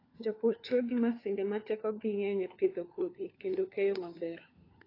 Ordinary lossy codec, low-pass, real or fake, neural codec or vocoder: AAC, 24 kbps; 5.4 kHz; fake; codec, 16 kHz, 4 kbps, FunCodec, trained on LibriTTS, 50 frames a second